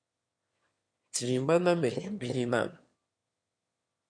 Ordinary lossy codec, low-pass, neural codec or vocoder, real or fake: MP3, 48 kbps; 9.9 kHz; autoencoder, 22.05 kHz, a latent of 192 numbers a frame, VITS, trained on one speaker; fake